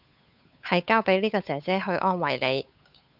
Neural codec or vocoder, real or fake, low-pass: codec, 16 kHz, 8 kbps, FunCodec, trained on Chinese and English, 25 frames a second; fake; 5.4 kHz